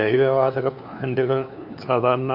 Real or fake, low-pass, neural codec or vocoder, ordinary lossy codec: fake; 5.4 kHz; codec, 16 kHz, 2 kbps, FunCodec, trained on LibriTTS, 25 frames a second; none